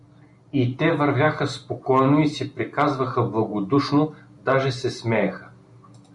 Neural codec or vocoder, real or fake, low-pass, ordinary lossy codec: none; real; 10.8 kHz; MP3, 96 kbps